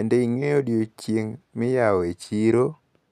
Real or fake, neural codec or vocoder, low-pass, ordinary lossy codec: real; none; 14.4 kHz; none